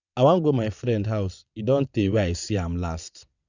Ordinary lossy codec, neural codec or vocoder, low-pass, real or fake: none; vocoder, 22.05 kHz, 80 mel bands, WaveNeXt; 7.2 kHz; fake